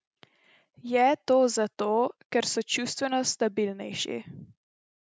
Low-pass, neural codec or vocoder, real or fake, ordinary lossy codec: none; none; real; none